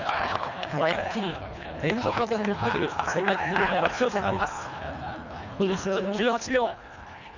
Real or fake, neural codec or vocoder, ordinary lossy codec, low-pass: fake; codec, 24 kHz, 1.5 kbps, HILCodec; none; 7.2 kHz